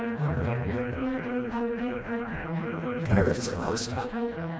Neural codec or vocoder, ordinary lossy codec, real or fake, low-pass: codec, 16 kHz, 1 kbps, FreqCodec, smaller model; none; fake; none